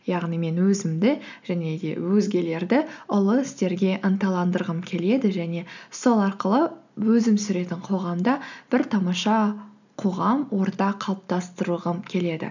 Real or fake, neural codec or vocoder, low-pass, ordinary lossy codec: real; none; 7.2 kHz; none